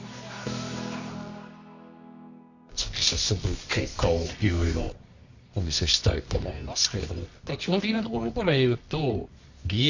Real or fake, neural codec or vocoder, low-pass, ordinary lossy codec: fake; codec, 24 kHz, 0.9 kbps, WavTokenizer, medium music audio release; 7.2 kHz; Opus, 64 kbps